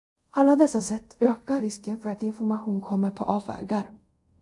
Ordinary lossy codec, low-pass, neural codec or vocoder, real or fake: MP3, 64 kbps; 10.8 kHz; codec, 24 kHz, 0.5 kbps, DualCodec; fake